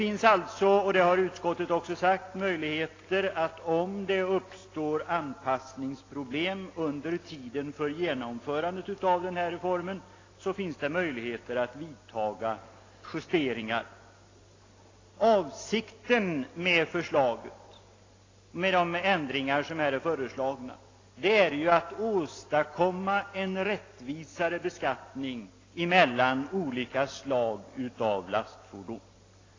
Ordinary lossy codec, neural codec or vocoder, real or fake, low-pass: AAC, 32 kbps; none; real; 7.2 kHz